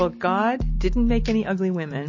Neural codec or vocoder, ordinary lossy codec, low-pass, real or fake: none; MP3, 32 kbps; 7.2 kHz; real